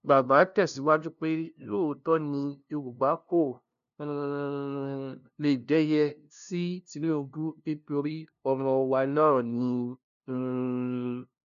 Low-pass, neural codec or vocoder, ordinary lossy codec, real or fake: 7.2 kHz; codec, 16 kHz, 0.5 kbps, FunCodec, trained on LibriTTS, 25 frames a second; none; fake